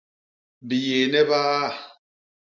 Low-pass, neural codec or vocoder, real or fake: 7.2 kHz; none; real